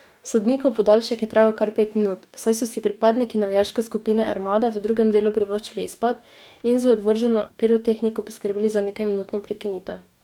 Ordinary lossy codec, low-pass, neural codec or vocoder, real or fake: none; 19.8 kHz; codec, 44.1 kHz, 2.6 kbps, DAC; fake